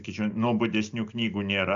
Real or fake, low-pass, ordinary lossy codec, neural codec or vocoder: real; 7.2 kHz; AAC, 64 kbps; none